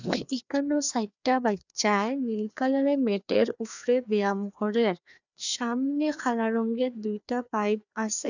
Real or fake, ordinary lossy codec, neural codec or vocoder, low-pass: fake; none; codec, 16 kHz, 2 kbps, FreqCodec, larger model; 7.2 kHz